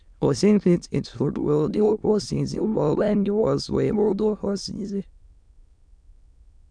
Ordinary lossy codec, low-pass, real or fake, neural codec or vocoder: none; 9.9 kHz; fake; autoencoder, 22.05 kHz, a latent of 192 numbers a frame, VITS, trained on many speakers